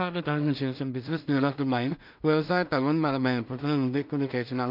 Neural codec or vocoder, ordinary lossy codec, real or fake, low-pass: codec, 16 kHz in and 24 kHz out, 0.4 kbps, LongCat-Audio-Codec, two codebook decoder; none; fake; 5.4 kHz